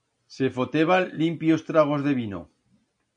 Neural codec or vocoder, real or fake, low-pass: none; real; 9.9 kHz